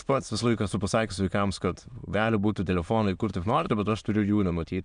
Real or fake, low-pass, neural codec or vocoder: fake; 9.9 kHz; autoencoder, 22.05 kHz, a latent of 192 numbers a frame, VITS, trained on many speakers